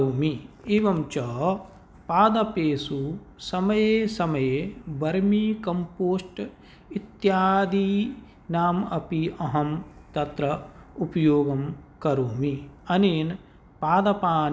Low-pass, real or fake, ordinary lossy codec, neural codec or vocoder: none; real; none; none